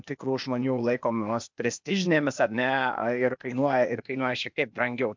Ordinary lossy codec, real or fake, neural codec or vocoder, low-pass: MP3, 64 kbps; fake; codec, 16 kHz, 0.8 kbps, ZipCodec; 7.2 kHz